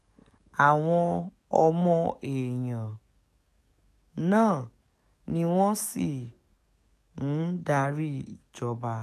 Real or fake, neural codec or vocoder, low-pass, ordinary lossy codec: fake; codec, 44.1 kHz, 7.8 kbps, DAC; 14.4 kHz; none